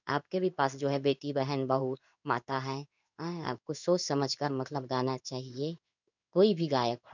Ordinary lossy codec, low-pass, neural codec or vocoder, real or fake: none; 7.2 kHz; codec, 16 kHz in and 24 kHz out, 1 kbps, XY-Tokenizer; fake